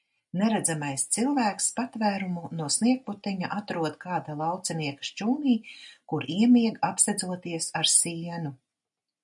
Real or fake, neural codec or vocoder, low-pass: real; none; 10.8 kHz